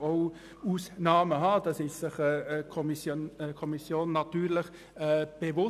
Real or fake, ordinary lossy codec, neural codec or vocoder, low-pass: real; none; none; 14.4 kHz